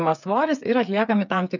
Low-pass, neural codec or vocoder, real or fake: 7.2 kHz; codec, 16 kHz, 8 kbps, FreqCodec, smaller model; fake